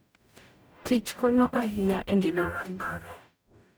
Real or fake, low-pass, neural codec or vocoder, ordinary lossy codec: fake; none; codec, 44.1 kHz, 0.9 kbps, DAC; none